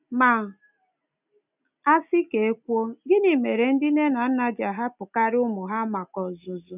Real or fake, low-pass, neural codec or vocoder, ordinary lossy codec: real; 3.6 kHz; none; none